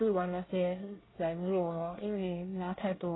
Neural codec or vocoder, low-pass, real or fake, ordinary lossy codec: codec, 24 kHz, 1 kbps, SNAC; 7.2 kHz; fake; AAC, 16 kbps